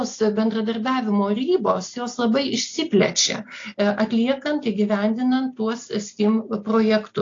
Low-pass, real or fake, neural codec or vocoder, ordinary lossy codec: 7.2 kHz; real; none; AAC, 48 kbps